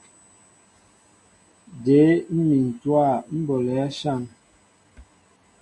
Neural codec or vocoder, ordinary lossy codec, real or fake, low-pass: none; AAC, 48 kbps; real; 10.8 kHz